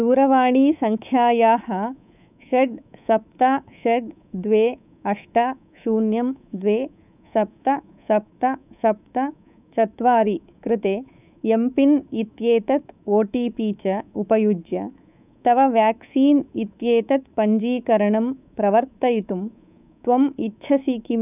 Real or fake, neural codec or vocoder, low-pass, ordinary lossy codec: fake; codec, 24 kHz, 3.1 kbps, DualCodec; 3.6 kHz; none